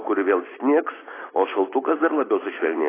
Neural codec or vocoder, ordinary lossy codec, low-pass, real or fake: none; AAC, 16 kbps; 3.6 kHz; real